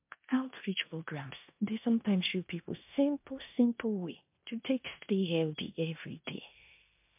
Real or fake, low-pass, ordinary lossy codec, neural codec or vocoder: fake; 3.6 kHz; MP3, 32 kbps; codec, 16 kHz in and 24 kHz out, 0.9 kbps, LongCat-Audio-Codec, four codebook decoder